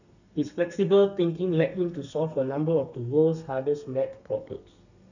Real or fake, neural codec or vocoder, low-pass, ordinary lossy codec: fake; codec, 32 kHz, 1.9 kbps, SNAC; 7.2 kHz; none